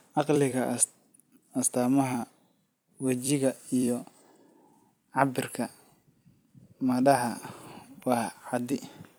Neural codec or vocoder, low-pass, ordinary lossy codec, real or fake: vocoder, 44.1 kHz, 128 mel bands every 256 samples, BigVGAN v2; none; none; fake